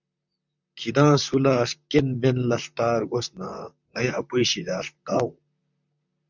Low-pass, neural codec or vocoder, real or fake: 7.2 kHz; vocoder, 44.1 kHz, 128 mel bands, Pupu-Vocoder; fake